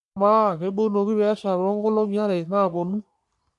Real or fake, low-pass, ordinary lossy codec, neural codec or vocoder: fake; 10.8 kHz; none; codec, 44.1 kHz, 3.4 kbps, Pupu-Codec